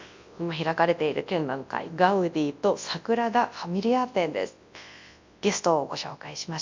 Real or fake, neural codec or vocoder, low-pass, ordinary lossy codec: fake; codec, 24 kHz, 0.9 kbps, WavTokenizer, large speech release; 7.2 kHz; MP3, 64 kbps